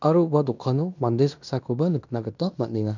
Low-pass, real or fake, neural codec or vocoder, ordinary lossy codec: 7.2 kHz; fake; codec, 16 kHz in and 24 kHz out, 0.9 kbps, LongCat-Audio-Codec, fine tuned four codebook decoder; none